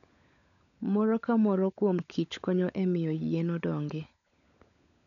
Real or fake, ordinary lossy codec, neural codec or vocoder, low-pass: fake; none; codec, 16 kHz, 16 kbps, FunCodec, trained on LibriTTS, 50 frames a second; 7.2 kHz